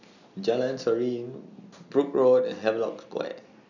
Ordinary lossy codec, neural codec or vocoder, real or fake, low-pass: none; none; real; 7.2 kHz